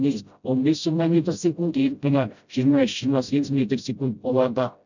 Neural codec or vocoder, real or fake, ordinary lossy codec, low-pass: codec, 16 kHz, 0.5 kbps, FreqCodec, smaller model; fake; none; 7.2 kHz